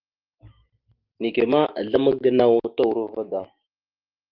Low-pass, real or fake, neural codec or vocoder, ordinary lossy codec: 5.4 kHz; fake; autoencoder, 48 kHz, 128 numbers a frame, DAC-VAE, trained on Japanese speech; Opus, 24 kbps